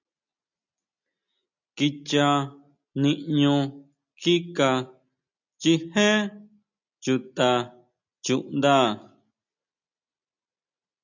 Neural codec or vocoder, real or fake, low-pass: none; real; 7.2 kHz